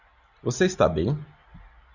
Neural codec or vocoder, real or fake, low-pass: none; real; 7.2 kHz